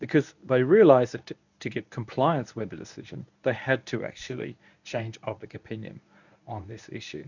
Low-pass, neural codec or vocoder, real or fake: 7.2 kHz; codec, 24 kHz, 0.9 kbps, WavTokenizer, medium speech release version 1; fake